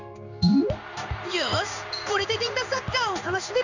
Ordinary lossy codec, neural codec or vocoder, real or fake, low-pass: none; codec, 16 kHz in and 24 kHz out, 1 kbps, XY-Tokenizer; fake; 7.2 kHz